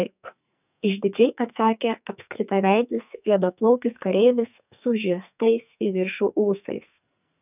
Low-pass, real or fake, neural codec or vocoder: 3.6 kHz; fake; codec, 44.1 kHz, 2.6 kbps, SNAC